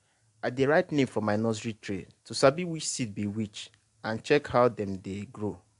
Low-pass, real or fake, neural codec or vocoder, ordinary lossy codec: 10.8 kHz; real; none; AAC, 64 kbps